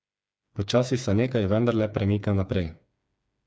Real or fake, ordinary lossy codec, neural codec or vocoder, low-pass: fake; none; codec, 16 kHz, 4 kbps, FreqCodec, smaller model; none